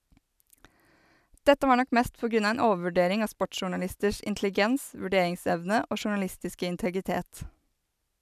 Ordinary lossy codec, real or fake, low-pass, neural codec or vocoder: none; real; 14.4 kHz; none